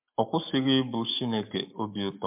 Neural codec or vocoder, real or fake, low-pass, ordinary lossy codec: codec, 44.1 kHz, 7.8 kbps, Pupu-Codec; fake; 3.6 kHz; MP3, 32 kbps